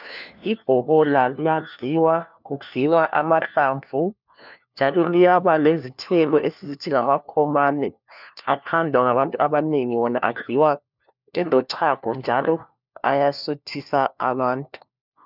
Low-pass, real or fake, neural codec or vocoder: 5.4 kHz; fake; codec, 16 kHz, 1 kbps, FunCodec, trained on LibriTTS, 50 frames a second